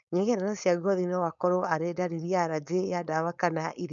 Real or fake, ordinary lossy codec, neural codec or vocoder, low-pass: fake; none; codec, 16 kHz, 4.8 kbps, FACodec; 7.2 kHz